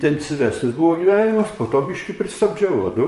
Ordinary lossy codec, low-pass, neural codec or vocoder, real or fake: MP3, 48 kbps; 14.4 kHz; vocoder, 44.1 kHz, 128 mel bands, Pupu-Vocoder; fake